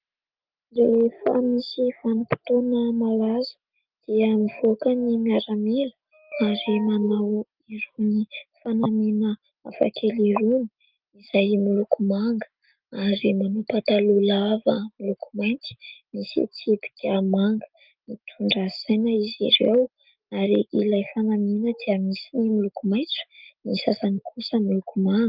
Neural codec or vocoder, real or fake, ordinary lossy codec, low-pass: none; real; Opus, 24 kbps; 5.4 kHz